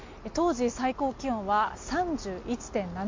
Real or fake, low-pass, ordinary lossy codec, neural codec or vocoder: real; 7.2 kHz; MP3, 48 kbps; none